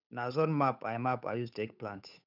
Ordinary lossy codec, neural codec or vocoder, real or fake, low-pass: none; codec, 16 kHz, 8 kbps, FunCodec, trained on Chinese and English, 25 frames a second; fake; 5.4 kHz